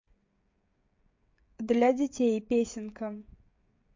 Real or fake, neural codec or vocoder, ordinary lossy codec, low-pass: fake; codec, 16 kHz, 16 kbps, FreqCodec, smaller model; MP3, 48 kbps; 7.2 kHz